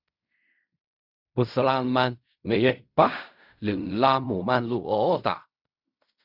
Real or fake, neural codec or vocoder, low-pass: fake; codec, 16 kHz in and 24 kHz out, 0.4 kbps, LongCat-Audio-Codec, fine tuned four codebook decoder; 5.4 kHz